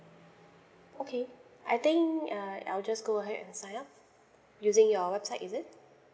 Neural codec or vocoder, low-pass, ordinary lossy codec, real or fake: none; none; none; real